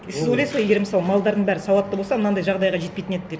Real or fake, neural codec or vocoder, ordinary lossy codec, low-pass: real; none; none; none